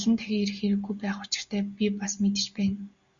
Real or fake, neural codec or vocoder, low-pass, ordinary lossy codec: real; none; 7.2 kHz; Opus, 64 kbps